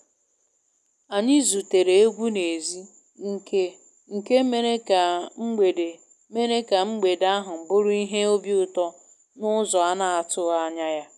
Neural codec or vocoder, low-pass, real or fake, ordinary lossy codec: none; none; real; none